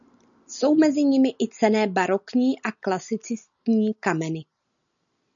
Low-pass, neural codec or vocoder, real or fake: 7.2 kHz; none; real